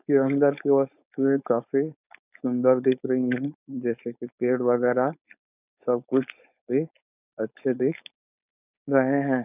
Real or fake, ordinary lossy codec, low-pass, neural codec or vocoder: fake; none; 3.6 kHz; codec, 16 kHz, 4.8 kbps, FACodec